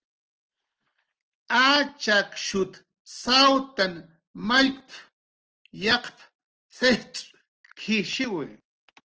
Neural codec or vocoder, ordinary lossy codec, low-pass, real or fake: none; Opus, 16 kbps; 7.2 kHz; real